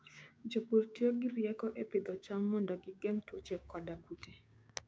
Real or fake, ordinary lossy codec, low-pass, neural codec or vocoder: fake; none; none; codec, 16 kHz, 6 kbps, DAC